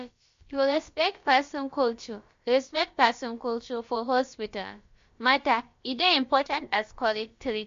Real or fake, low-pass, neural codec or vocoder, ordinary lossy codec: fake; 7.2 kHz; codec, 16 kHz, about 1 kbps, DyCAST, with the encoder's durations; MP3, 48 kbps